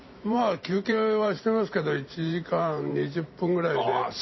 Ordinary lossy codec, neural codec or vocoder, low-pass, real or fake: MP3, 24 kbps; vocoder, 44.1 kHz, 128 mel bands every 512 samples, BigVGAN v2; 7.2 kHz; fake